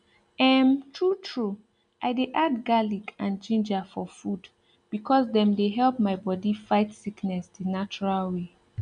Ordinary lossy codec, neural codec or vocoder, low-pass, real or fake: none; none; 9.9 kHz; real